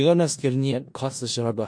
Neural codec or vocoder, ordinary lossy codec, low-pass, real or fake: codec, 16 kHz in and 24 kHz out, 0.4 kbps, LongCat-Audio-Codec, four codebook decoder; MP3, 48 kbps; 9.9 kHz; fake